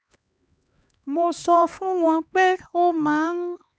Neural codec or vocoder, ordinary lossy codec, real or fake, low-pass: codec, 16 kHz, 2 kbps, X-Codec, HuBERT features, trained on LibriSpeech; none; fake; none